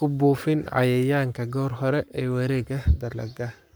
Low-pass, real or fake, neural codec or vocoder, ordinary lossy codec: none; fake; codec, 44.1 kHz, 7.8 kbps, Pupu-Codec; none